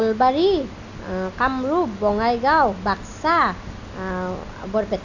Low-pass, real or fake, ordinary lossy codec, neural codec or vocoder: 7.2 kHz; real; none; none